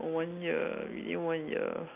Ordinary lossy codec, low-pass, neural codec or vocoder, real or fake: none; 3.6 kHz; none; real